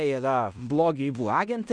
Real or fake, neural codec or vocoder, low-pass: fake; codec, 16 kHz in and 24 kHz out, 0.9 kbps, LongCat-Audio-Codec, fine tuned four codebook decoder; 9.9 kHz